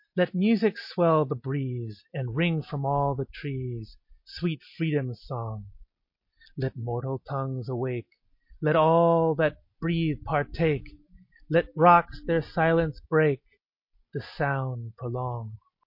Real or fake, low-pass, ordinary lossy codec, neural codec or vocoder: real; 5.4 kHz; MP3, 32 kbps; none